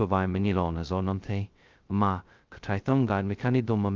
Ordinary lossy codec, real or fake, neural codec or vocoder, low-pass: Opus, 24 kbps; fake; codec, 16 kHz, 0.2 kbps, FocalCodec; 7.2 kHz